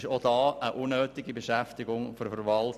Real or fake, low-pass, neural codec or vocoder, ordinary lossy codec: real; 14.4 kHz; none; none